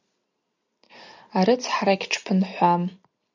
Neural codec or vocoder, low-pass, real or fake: none; 7.2 kHz; real